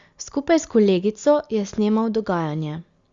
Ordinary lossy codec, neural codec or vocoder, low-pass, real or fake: Opus, 64 kbps; none; 7.2 kHz; real